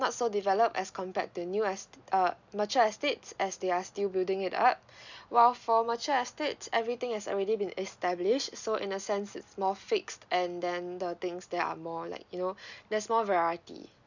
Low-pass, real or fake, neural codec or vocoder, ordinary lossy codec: 7.2 kHz; real; none; none